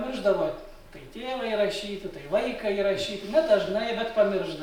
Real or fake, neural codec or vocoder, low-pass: real; none; 19.8 kHz